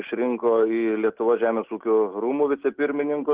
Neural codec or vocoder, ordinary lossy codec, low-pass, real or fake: none; Opus, 16 kbps; 3.6 kHz; real